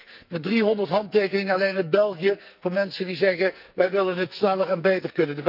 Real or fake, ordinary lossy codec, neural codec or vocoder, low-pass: fake; none; codec, 44.1 kHz, 2.6 kbps, SNAC; 5.4 kHz